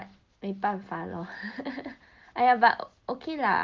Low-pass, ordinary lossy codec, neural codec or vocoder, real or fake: 7.2 kHz; Opus, 32 kbps; none; real